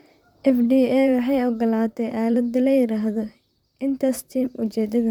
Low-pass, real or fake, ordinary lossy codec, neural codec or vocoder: 19.8 kHz; fake; none; vocoder, 44.1 kHz, 128 mel bands, Pupu-Vocoder